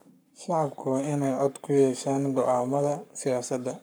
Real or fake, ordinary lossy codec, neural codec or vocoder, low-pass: fake; none; codec, 44.1 kHz, 7.8 kbps, Pupu-Codec; none